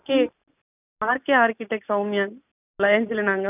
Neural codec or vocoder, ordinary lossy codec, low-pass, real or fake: none; none; 3.6 kHz; real